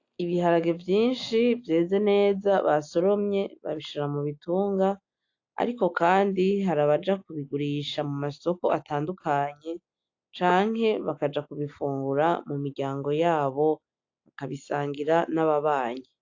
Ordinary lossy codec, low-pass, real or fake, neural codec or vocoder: AAC, 48 kbps; 7.2 kHz; real; none